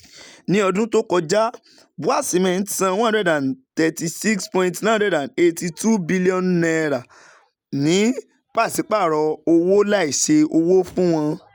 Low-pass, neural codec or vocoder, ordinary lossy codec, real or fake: none; none; none; real